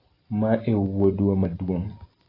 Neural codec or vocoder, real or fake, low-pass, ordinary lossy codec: vocoder, 44.1 kHz, 128 mel bands every 256 samples, BigVGAN v2; fake; 5.4 kHz; AAC, 24 kbps